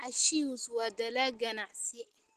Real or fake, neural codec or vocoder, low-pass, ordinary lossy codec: real; none; 14.4 kHz; Opus, 16 kbps